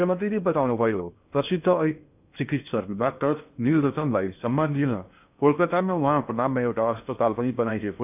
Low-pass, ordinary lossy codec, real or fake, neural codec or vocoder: 3.6 kHz; none; fake; codec, 16 kHz in and 24 kHz out, 0.6 kbps, FocalCodec, streaming, 4096 codes